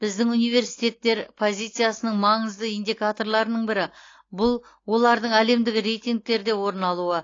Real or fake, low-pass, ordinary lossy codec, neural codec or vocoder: real; 7.2 kHz; AAC, 32 kbps; none